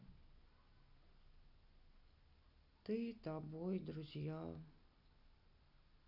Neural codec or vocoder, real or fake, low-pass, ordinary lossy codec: none; real; 5.4 kHz; none